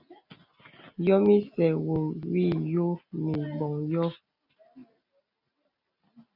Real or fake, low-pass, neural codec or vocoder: real; 5.4 kHz; none